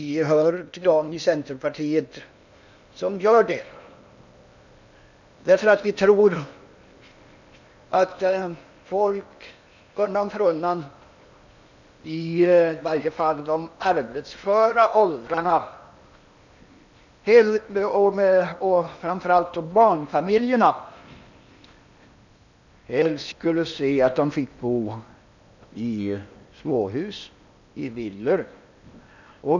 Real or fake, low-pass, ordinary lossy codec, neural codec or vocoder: fake; 7.2 kHz; none; codec, 16 kHz in and 24 kHz out, 0.8 kbps, FocalCodec, streaming, 65536 codes